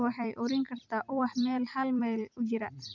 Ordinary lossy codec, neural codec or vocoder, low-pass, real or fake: none; vocoder, 44.1 kHz, 128 mel bands every 256 samples, BigVGAN v2; 7.2 kHz; fake